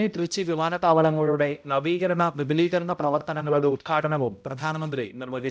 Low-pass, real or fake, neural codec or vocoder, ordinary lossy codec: none; fake; codec, 16 kHz, 0.5 kbps, X-Codec, HuBERT features, trained on balanced general audio; none